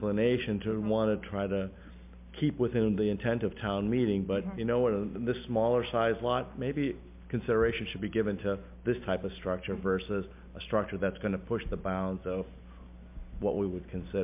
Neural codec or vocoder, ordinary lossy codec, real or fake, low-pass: none; MP3, 32 kbps; real; 3.6 kHz